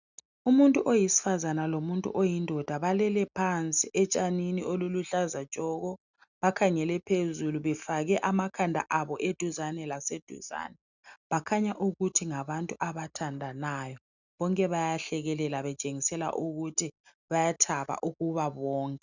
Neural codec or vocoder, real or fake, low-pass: none; real; 7.2 kHz